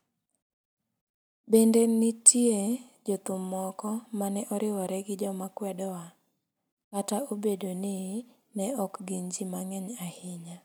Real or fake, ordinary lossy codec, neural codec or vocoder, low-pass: real; none; none; none